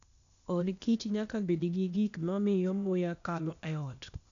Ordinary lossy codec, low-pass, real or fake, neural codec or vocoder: none; 7.2 kHz; fake; codec, 16 kHz, 0.8 kbps, ZipCodec